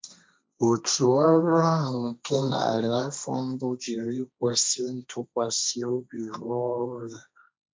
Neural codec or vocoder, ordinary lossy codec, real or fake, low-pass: codec, 16 kHz, 1.1 kbps, Voila-Tokenizer; none; fake; none